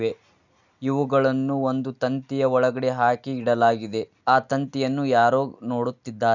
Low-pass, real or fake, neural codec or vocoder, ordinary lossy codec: 7.2 kHz; real; none; none